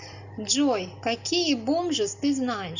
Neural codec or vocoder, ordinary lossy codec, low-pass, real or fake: none; Opus, 64 kbps; 7.2 kHz; real